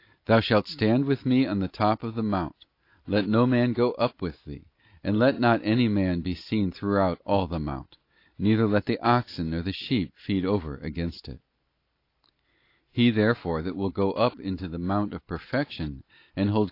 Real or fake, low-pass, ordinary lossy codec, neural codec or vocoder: real; 5.4 kHz; AAC, 32 kbps; none